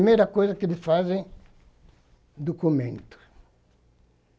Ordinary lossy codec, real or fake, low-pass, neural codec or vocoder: none; real; none; none